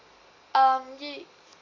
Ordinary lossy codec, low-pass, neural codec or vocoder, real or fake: none; 7.2 kHz; none; real